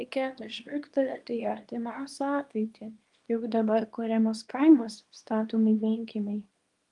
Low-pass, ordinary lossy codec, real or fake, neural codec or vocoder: 10.8 kHz; Opus, 32 kbps; fake; codec, 24 kHz, 0.9 kbps, WavTokenizer, small release